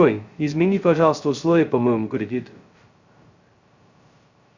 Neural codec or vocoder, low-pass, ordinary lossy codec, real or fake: codec, 16 kHz, 0.2 kbps, FocalCodec; 7.2 kHz; Opus, 64 kbps; fake